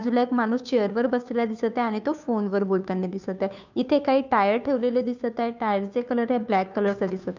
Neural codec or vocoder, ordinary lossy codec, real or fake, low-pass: codec, 16 kHz, 2 kbps, FunCodec, trained on Chinese and English, 25 frames a second; none; fake; 7.2 kHz